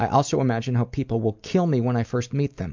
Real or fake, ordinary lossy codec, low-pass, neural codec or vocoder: real; MP3, 64 kbps; 7.2 kHz; none